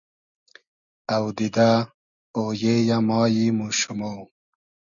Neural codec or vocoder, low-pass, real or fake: none; 7.2 kHz; real